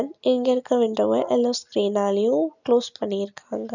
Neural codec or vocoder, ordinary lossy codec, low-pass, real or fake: none; none; 7.2 kHz; real